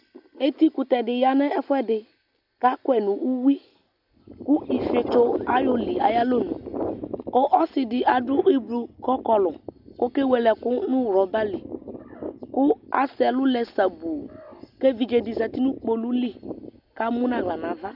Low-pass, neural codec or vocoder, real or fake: 5.4 kHz; none; real